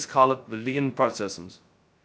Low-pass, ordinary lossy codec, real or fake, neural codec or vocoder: none; none; fake; codec, 16 kHz, 0.2 kbps, FocalCodec